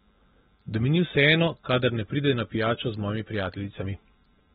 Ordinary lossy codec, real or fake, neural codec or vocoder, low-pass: AAC, 16 kbps; real; none; 7.2 kHz